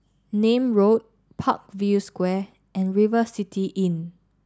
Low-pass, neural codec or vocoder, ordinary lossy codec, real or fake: none; none; none; real